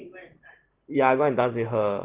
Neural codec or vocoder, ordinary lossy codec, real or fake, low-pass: none; Opus, 16 kbps; real; 3.6 kHz